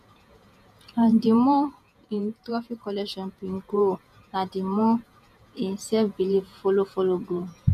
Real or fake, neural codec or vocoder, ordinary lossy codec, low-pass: fake; vocoder, 48 kHz, 128 mel bands, Vocos; none; 14.4 kHz